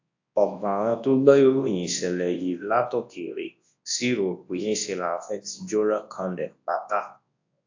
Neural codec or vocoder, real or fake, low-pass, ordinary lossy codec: codec, 24 kHz, 0.9 kbps, WavTokenizer, large speech release; fake; 7.2 kHz; AAC, 48 kbps